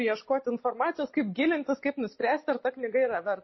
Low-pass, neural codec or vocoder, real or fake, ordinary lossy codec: 7.2 kHz; none; real; MP3, 24 kbps